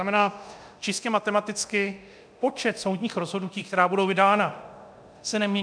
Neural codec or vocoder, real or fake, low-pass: codec, 24 kHz, 0.9 kbps, DualCodec; fake; 9.9 kHz